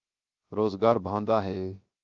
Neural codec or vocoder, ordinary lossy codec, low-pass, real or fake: codec, 16 kHz, 0.7 kbps, FocalCodec; Opus, 32 kbps; 7.2 kHz; fake